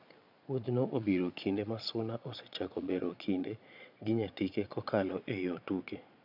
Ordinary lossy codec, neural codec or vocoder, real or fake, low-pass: none; vocoder, 22.05 kHz, 80 mel bands, Vocos; fake; 5.4 kHz